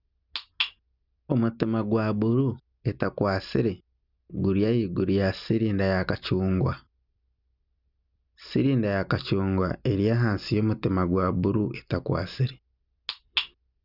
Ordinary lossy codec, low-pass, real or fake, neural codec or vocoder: none; 5.4 kHz; real; none